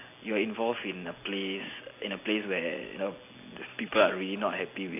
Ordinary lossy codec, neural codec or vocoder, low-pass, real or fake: AAC, 24 kbps; none; 3.6 kHz; real